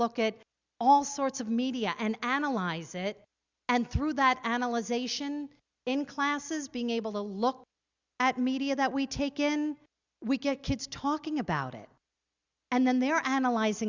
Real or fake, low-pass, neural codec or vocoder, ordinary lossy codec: real; 7.2 kHz; none; Opus, 64 kbps